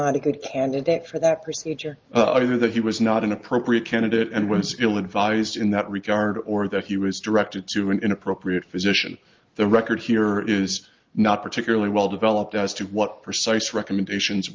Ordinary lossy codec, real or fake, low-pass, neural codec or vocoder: Opus, 32 kbps; real; 7.2 kHz; none